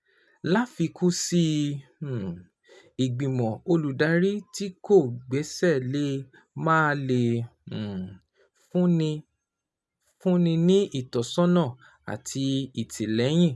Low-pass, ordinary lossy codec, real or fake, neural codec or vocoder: none; none; real; none